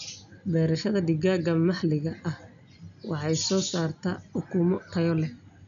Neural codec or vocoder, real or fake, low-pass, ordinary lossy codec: none; real; 7.2 kHz; none